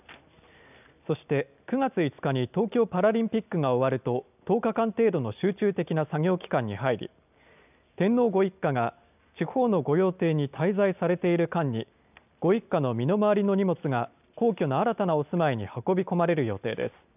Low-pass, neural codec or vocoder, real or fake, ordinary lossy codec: 3.6 kHz; none; real; none